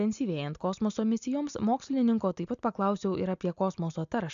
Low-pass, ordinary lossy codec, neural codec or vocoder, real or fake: 7.2 kHz; AAC, 96 kbps; none; real